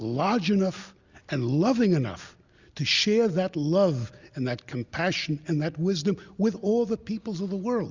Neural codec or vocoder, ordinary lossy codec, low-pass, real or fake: none; Opus, 64 kbps; 7.2 kHz; real